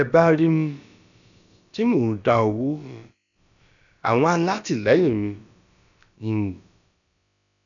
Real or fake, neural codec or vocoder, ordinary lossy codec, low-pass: fake; codec, 16 kHz, about 1 kbps, DyCAST, with the encoder's durations; none; 7.2 kHz